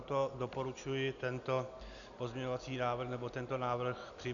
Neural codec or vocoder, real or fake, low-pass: none; real; 7.2 kHz